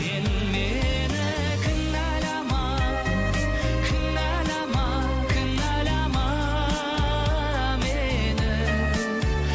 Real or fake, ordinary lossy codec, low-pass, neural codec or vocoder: real; none; none; none